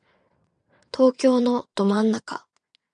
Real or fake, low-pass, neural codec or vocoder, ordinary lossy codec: fake; 9.9 kHz; vocoder, 22.05 kHz, 80 mel bands, WaveNeXt; MP3, 96 kbps